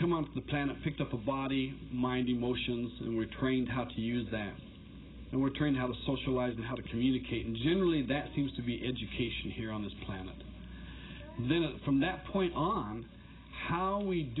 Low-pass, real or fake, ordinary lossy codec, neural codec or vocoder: 7.2 kHz; real; AAC, 16 kbps; none